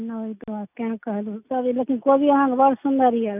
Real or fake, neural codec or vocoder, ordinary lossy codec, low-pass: real; none; none; 3.6 kHz